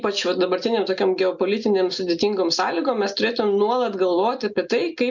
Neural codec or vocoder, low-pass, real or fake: none; 7.2 kHz; real